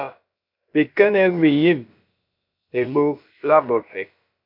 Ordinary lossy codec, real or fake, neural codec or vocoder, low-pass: MP3, 48 kbps; fake; codec, 16 kHz, about 1 kbps, DyCAST, with the encoder's durations; 5.4 kHz